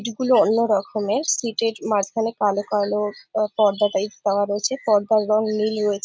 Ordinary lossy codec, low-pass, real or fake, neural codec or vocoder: none; none; real; none